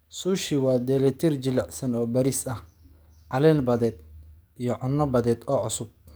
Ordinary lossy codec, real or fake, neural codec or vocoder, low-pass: none; fake; codec, 44.1 kHz, 7.8 kbps, DAC; none